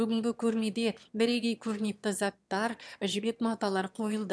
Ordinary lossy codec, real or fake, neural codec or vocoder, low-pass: none; fake; autoencoder, 22.05 kHz, a latent of 192 numbers a frame, VITS, trained on one speaker; none